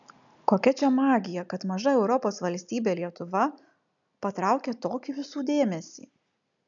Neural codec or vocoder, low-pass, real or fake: none; 7.2 kHz; real